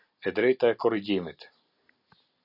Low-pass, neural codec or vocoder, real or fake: 5.4 kHz; none; real